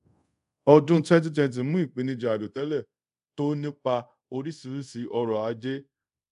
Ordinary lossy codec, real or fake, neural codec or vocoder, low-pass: AAC, 96 kbps; fake; codec, 24 kHz, 0.5 kbps, DualCodec; 10.8 kHz